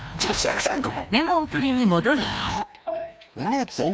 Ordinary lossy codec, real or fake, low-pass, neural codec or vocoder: none; fake; none; codec, 16 kHz, 1 kbps, FreqCodec, larger model